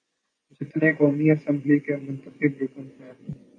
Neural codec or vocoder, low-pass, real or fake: vocoder, 24 kHz, 100 mel bands, Vocos; 9.9 kHz; fake